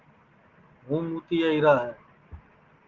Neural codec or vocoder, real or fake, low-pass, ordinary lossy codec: none; real; 7.2 kHz; Opus, 16 kbps